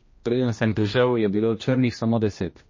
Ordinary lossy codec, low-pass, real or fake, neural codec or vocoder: MP3, 32 kbps; 7.2 kHz; fake; codec, 16 kHz, 1 kbps, X-Codec, HuBERT features, trained on general audio